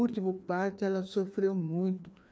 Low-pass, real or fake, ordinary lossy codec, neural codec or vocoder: none; fake; none; codec, 16 kHz, 2 kbps, FreqCodec, larger model